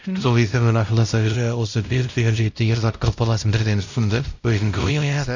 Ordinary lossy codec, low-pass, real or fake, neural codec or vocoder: none; 7.2 kHz; fake; codec, 16 kHz, 0.5 kbps, X-Codec, WavLM features, trained on Multilingual LibriSpeech